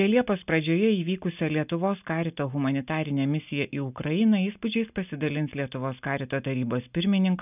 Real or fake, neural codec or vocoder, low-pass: real; none; 3.6 kHz